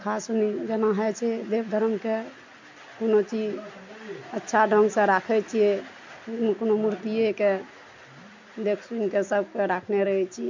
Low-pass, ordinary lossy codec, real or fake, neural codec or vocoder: 7.2 kHz; MP3, 48 kbps; real; none